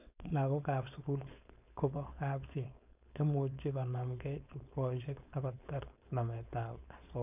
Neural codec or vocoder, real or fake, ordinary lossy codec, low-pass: codec, 16 kHz, 4.8 kbps, FACodec; fake; none; 3.6 kHz